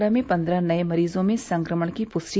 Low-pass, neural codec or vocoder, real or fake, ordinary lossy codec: none; none; real; none